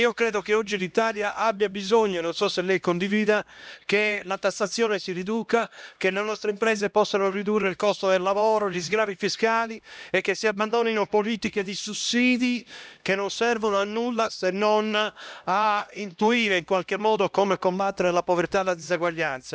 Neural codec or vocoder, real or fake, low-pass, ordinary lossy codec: codec, 16 kHz, 1 kbps, X-Codec, HuBERT features, trained on LibriSpeech; fake; none; none